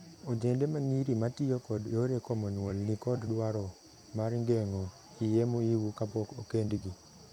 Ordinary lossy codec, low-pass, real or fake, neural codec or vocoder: none; 19.8 kHz; fake; vocoder, 44.1 kHz, 128 mel bands every 256 samples, BigVGAN v2